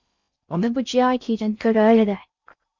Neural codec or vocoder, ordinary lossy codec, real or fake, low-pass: codec, 16 kHz in and 24 kHz out, 0.6 kbps, FocalCodec, streaming, 4096 codes; Opus, 64 kbps; fake; 7.2 kHz